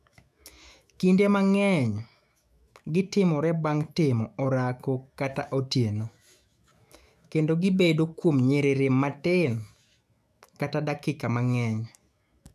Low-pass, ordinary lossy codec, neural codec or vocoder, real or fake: 14.4 kHz; none; autoencoder, 48 kHz, 128 numbers a frame, DAC-VAE, trained on Japanese speech; fake